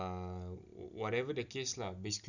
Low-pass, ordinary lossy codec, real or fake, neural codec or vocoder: 7.2 kHz; none; real; none